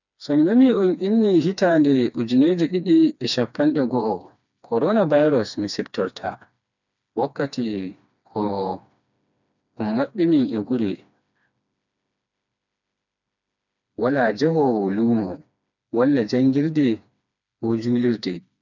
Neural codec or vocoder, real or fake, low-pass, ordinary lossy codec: codec, 16 kHz, 2 kbps, FreqCodec, smaller model; fake; 7.2 kHz; none